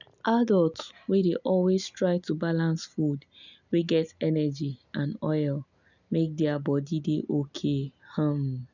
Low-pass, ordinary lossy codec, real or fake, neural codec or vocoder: 7.2 kHz; none; real; none